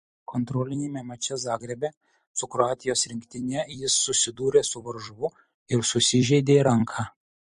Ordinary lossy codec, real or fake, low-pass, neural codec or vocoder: MP3, 48 kbps; fake; 14.4 kHz; vocoder, 44.1 kHz, 128 mel bands every 256 samples, BigVGAN v2